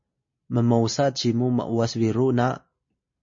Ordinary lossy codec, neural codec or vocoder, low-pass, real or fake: MP3, 32 kbps; none; 7.2 kHz; real